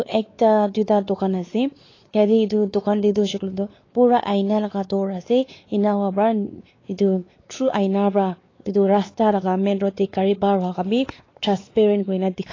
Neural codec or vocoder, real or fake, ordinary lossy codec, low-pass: codec, 16 kHz, 4 kbps, X-Codec, WavLM features, trained on Multilingual LibriSpeech; fake; AAC, 32 kbps; 7.2 kHz